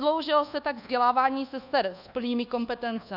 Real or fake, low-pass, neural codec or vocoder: fake; 5.4 kHz; codec, 24 kHz, 1.2 kbps, DualCodec